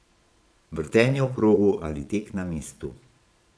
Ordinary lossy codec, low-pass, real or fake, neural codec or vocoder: none; none; fake; vocoder, 22.05 kHz, 80 mel bands, Vocos